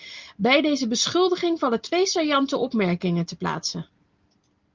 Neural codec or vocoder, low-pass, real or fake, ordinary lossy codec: none; 7.2 kHz; real; Opus, 24 kbps